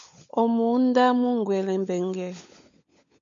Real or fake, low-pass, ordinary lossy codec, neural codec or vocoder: fake; 7.2 kHz; MP3, 64 kbps; codec, 16 kHz, 16 kbps, FunCodec, trained on LibriTTS, 50 frames a second